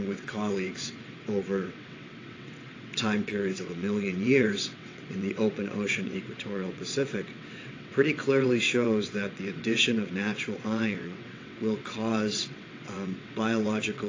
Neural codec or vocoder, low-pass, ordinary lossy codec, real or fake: vocoder, 22.05 kHz, 80 mel bands, WaveNeXt; 7.2 kHz; AAC, 32 kbps; fake